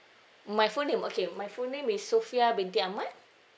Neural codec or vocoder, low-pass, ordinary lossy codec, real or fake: none; none; none; real